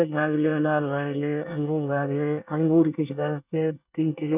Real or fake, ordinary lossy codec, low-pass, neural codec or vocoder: fake; none; 3.6 kHz; codec, 24 kHz, 1 kbps, SNAC